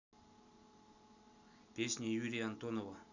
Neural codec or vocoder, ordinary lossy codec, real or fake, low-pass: none; none; real; 7.2 kHz